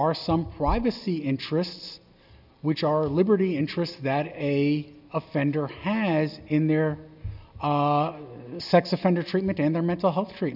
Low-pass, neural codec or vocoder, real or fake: 5.4 kHz; none; real